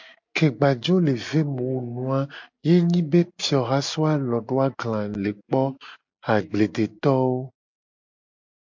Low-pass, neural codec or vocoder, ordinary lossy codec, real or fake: 7.2 kHz; none; MP3, 48 kbps; real